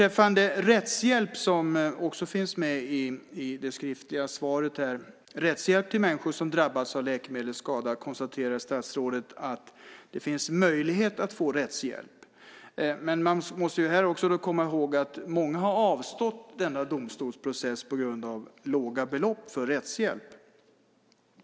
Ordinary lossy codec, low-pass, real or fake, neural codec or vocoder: none; none; real; none